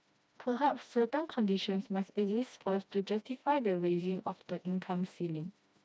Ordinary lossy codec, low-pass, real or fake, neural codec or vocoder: none; none; fake; codec, 16 kHz, 1 kbps, FreqCodec, smaller model